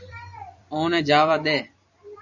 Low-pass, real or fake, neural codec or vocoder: 7.2 kHz; fake; vocoder, 44.1 kHz, 128 mel bands every 256 samples, BigVGAN v2